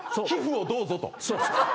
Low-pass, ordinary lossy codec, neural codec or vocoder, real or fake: none; none; none; real